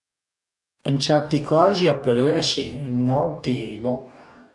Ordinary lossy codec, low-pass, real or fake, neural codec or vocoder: none; 10.8 kHz; fake; codec, 44.1 kHz, 2.6 kbps, DAC